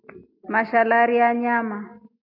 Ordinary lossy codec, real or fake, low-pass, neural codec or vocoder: AAC, 48 kbps; real; 5.4 kHz; none